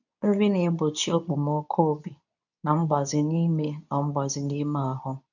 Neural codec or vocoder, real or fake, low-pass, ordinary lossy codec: codec, 24 kHz, 0.9 kbps, WavTokenizer, medium speech release version 2; fake; 7.2 kHz; none